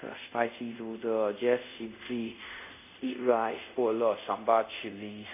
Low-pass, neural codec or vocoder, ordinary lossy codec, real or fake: 3.6 kHz; codec, 24 kHz, 0.5 kbps, DualCodec; none; fake